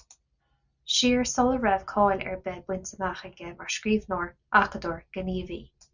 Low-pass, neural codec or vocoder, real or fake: 7.2 kHz; none; real